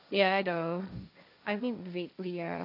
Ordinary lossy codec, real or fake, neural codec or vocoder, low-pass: none; fake; codec, 16 kHz, 1.1 kbps, Voila-Tokenizer; 5.4 kHz